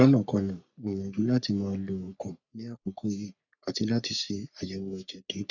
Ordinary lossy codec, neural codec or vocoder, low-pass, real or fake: none; codec, 44.1 kHz, 3.4 kbps, Pupu-Codec; 7.2 kHz; fake